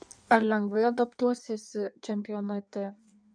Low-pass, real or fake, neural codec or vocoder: 9.9 kHz; fake; codec, 16 kHz in and 24 kHz out, 1.1 kbps, FireRedTTS-2 codec